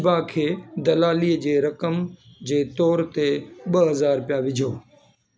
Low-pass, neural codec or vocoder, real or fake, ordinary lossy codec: none; none; real; none